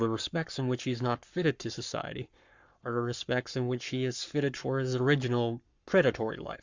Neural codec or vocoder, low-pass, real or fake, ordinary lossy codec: codec, 44.1 kHz, 7.8 kbps, Pupu-Codec; 7.2 kHz; fake; Opus, 64 kbps